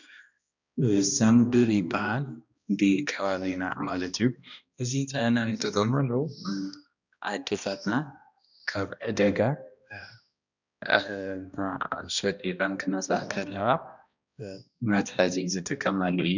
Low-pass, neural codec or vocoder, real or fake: 7.2 kHz; codec, 16 kHz, 1 kbps, X-Codec, HuBERT features, trained on balanced general audio; fake